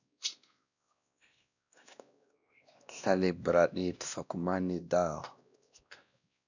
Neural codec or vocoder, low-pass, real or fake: codec, 16 kHz, 1 kbps, X-Codec, WavLM features, trained on Multilingual LibriSpeech; 7.2 kHz; fake